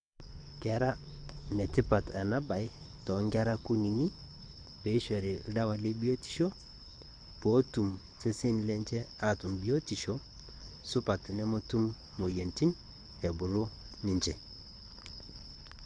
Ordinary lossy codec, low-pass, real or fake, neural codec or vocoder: none; 9.9 kHz; fake; codec, 24 kHz, 6 kbps, HILCodec